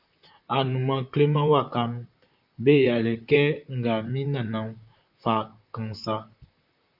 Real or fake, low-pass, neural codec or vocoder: fake; 5.4 kHz; vocoder, 44.1 kHz, 128 mel bands, Pupu-Vocoder